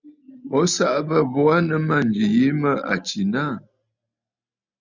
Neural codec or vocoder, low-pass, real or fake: none; 7.2 kHz; real